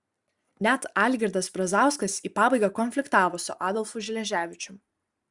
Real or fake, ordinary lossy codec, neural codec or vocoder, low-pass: real; Opus, 64 kbps; none; 10.8 kHz